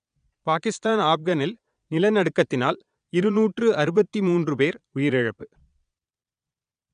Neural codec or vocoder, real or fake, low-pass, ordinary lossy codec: vocoder, 22.05 kHz, 80 mel bands, Vocos; fake; 9.9 kHz; none